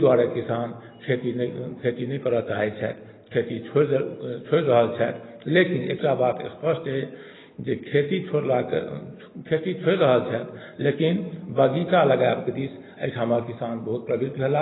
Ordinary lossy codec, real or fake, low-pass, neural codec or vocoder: AAC, 16 kbps; real; 7.2 kHz; none